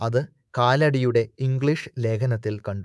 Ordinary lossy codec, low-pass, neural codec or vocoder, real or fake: none; none; codec, 24 kHz, 3.1 kbps, DualCodec; fake